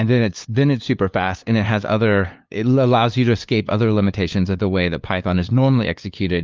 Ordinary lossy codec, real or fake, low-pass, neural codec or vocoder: Opus, 24 kbps; fake; 7.2 kHz; codec, 16 kHz, 2 kbps, FunCodec, trained on LibriTTS, 25 frames a second